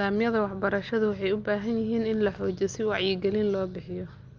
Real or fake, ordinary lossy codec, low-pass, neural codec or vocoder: real; Opus, 24 kbps; 7.2 kHz; none